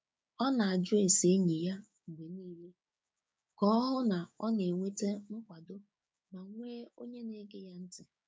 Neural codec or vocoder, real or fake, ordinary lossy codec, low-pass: codec, 16 kHz, 6 kbps, DAC; fake; none; none